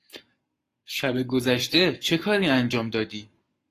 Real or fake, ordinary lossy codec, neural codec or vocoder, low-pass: fake; AAC, 48 kbps; codec, 44.1 kHz, 7.8 kbps, Pupu-Codec; 14.4 kHz